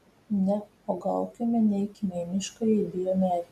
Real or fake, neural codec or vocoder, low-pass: real; none; 14.4 kHz